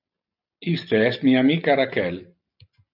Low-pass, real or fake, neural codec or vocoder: 5.4 kHz; real; none